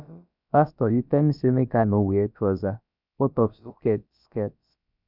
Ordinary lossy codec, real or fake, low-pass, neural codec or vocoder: none; fake; 5.4 kHz; codec, 16 kHz, about 1 kbps, DyCAST, with the encoder's durations